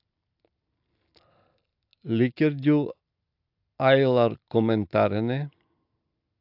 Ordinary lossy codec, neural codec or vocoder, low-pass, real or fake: AAC, 48 kbps; none; 5.4 kHz; real